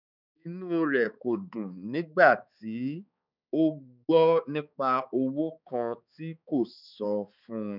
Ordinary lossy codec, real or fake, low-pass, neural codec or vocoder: none; fake; 5.4 kHz; codec, 16 kHz, 4 kbps, X-Codec, HuBERT features, trained on balanced general audio